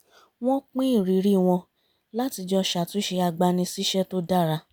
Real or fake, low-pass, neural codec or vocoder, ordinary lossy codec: real; none; none; none